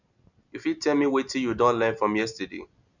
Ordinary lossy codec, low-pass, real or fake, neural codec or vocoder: none; 7.2 kHz; real; none